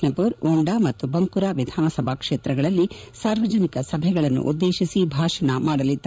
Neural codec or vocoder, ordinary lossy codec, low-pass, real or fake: codec, 16 kHz, 16 kbps, FreqCodec, larger model; none; none; fake